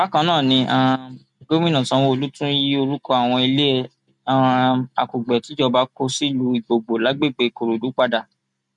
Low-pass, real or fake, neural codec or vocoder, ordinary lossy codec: 10.8 kHz; real; none; none